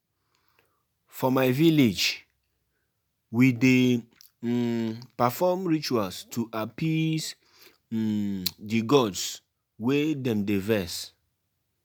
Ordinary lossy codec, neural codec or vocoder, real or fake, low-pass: none; none; real; none